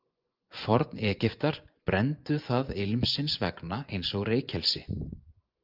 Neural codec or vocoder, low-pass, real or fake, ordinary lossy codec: vocoder, 24 kHz, 100 mel bands, Vocos; 5.4 kHz; fake; Opus, 24 kbps